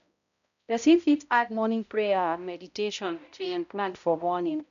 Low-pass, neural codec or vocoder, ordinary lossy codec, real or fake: 7.2 kHz; codec, 16 kHz, 0.5 kbps, X-Codec, HuBERT features, trained on balanced general audio; none; fake